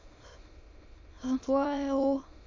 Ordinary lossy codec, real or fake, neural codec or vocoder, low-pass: MP3, 48 kbps; fake; autoencoder, 22.05 kHz, a latent of 192 numbers a frame, VITS, trained on many speakers; 7.2 kHz